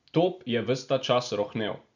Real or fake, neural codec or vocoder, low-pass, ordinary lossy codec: real; none; 7.2 kHz; none